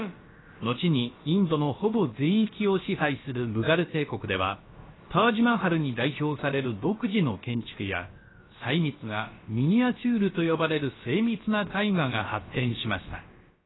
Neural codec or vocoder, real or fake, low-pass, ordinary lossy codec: codec, 16 kHz, about 1 kbps, DyCAST, with the encoder's durations; fake; 7.2 kHz; AAC, 16 kbps